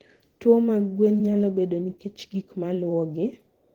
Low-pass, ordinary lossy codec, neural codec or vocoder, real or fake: 19.8 kHz; Opus, 16 kbps; vocoder, 44.1 kHz, 128 mel bands every 512 samples, BigVGAN v2; fake